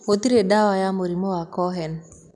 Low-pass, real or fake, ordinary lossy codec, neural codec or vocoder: 10.8 kHz; real; none; none